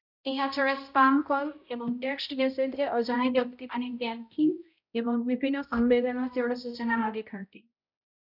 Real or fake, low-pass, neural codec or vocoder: fake; 5.4 kHz; codec, 16 kHz, 0.5 kbps, X-Codec, HuBERT features, trained on balanced general audio